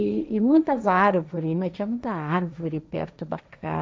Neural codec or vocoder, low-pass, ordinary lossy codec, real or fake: codec, 16 kHz, 1.1 kbps, Voila-Tokenizer; none; none; fake